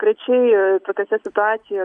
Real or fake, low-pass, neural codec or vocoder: real; 9.9 kHz; none